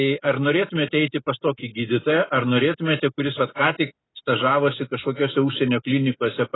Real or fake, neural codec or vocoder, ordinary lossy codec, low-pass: real; none; AAC, 16 kbps; 7.2 kHz